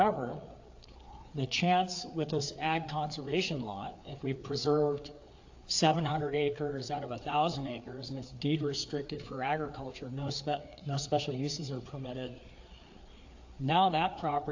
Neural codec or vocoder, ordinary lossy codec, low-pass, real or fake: codec, 16 kHz, 4 kbps, FreqCodec, larger model; AAC, 48 kbps; 7.2 kHz; fake